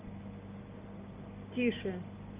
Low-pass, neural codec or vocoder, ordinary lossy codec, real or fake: 3.6 kHz; none; Opus, 24 kbps; real